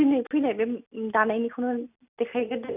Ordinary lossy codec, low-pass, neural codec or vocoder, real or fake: none; 3.6 kHz; none; real